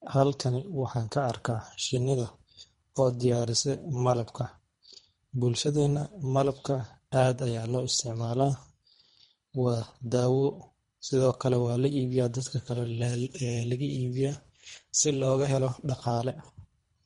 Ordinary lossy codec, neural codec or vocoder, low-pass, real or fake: MP3, 48 kbps; codec, 24 kHz, 3 kbps, HILCodec; 10.8 kHz; fake